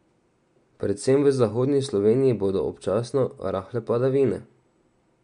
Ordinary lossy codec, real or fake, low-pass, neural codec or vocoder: MP3, 64 kbps; real; 9.9 kHz; none